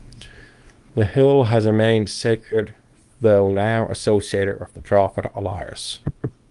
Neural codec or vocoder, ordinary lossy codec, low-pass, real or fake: codec, 24 kHz, 0.9 kbps, WavTokenizer, small release; Opus, 32 kbps; 10.8 kHz; fake